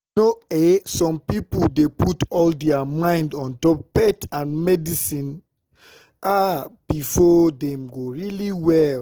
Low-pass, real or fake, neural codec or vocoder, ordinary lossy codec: 19.8 kHz; real; none; Opus, 16 kbps